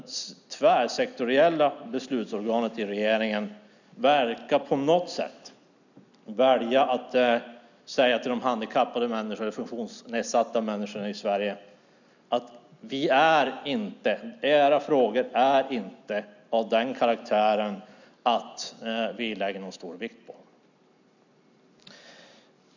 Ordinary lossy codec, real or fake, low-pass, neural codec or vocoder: none; real; 7.2 kHz; none